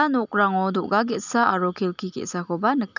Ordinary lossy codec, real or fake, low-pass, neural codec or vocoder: none; real; 7.2 kHz; none